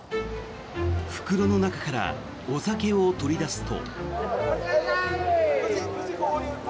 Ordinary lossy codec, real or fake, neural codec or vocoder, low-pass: none; real; none; none